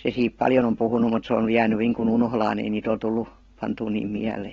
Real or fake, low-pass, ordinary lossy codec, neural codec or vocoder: real; 7.2 kHz; AAC, 24 kbps; none